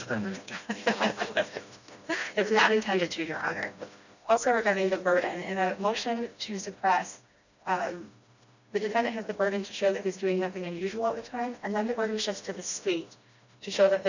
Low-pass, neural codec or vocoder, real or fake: 7.2 kHz; codec, 16 kHz, 1 kbps, FreqCodec, smaller model; fake